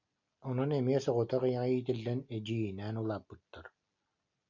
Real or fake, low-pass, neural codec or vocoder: real; 7.2 kHz; none